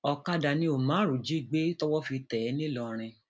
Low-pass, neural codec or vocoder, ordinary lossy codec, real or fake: none; none; none; real